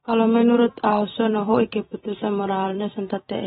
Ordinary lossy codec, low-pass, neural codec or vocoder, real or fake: AAC, 16 kbps; 19.8 kHz; vocoder, 44.1 kHz, 128 mel bands every 256 samples, BigVGAN v2; fake